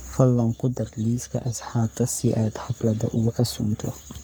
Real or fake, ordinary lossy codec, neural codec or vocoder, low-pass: fake; none; codec, 44.1 kHz, 3.4 kbps, Pupu-Codec; none